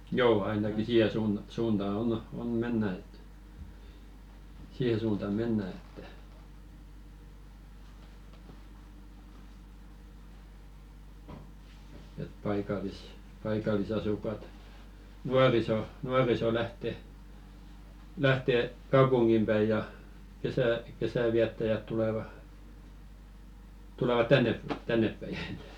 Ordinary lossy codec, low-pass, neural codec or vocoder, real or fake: none; 19.8 kHz; none; real